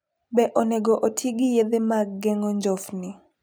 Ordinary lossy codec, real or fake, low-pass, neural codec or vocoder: none; real; none; none